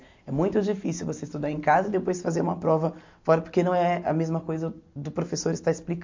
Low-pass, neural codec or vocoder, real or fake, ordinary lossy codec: 7.2 kHz; none; real; MP3, 48 kbps